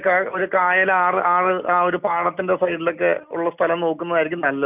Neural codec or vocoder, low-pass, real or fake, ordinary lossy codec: none; 3.6 kHz; real; none